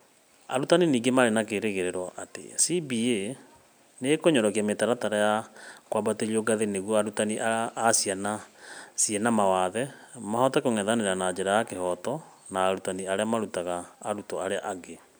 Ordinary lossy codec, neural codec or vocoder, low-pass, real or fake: none; none; none; real